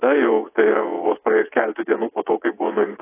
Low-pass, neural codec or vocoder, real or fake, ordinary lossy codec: 3.6 kHz; vocoder, 22.05 kHz, 80 mel bands, WaveNeXt; fake; AAC, 24 kbps